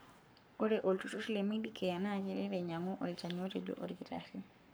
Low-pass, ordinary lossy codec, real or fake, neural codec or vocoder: none; none; fake; codec, 44.1 kHz, 7.8 kbps, Pupu-Codec